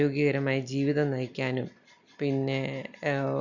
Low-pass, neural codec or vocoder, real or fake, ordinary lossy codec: 7.2 kHz; none; real; none